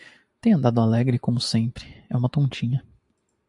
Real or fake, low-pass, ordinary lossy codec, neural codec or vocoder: real; 10.8 kHz; AAC, 64 kbps; none